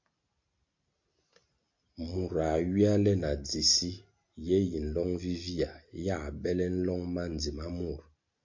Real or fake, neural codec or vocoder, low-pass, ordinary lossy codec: real; none; 7.2 kHz; MP3, 48 kbps